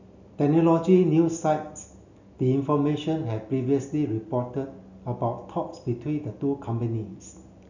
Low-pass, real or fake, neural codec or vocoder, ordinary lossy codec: 7.2 kHz; real; none; none